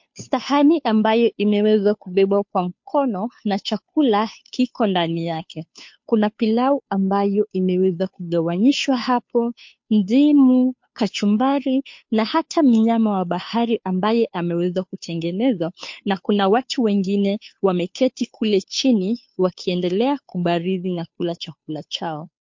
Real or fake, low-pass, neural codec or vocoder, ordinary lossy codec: fake; 7.2 kHz; codec, 16 kHz, 2 kbps, FunCodec, trained on Chinese and English, 25 frames a second; MP3, 48 kbps